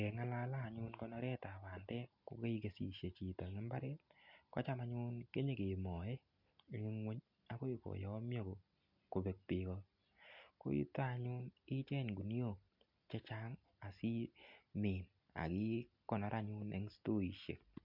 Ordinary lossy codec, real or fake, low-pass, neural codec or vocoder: MP3, 48 kbps; real; 5.4 kHz; none